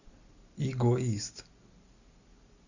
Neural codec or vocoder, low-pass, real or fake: none; 7.2 kHz; real